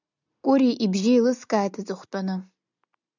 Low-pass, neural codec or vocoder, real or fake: 7.2 kHz; none; real